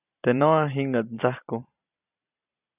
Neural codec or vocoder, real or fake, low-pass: vocoder, 44.1 kHz, 128 mel bands every 256 samples, BigVGAN v2; fake; 3.6 kHz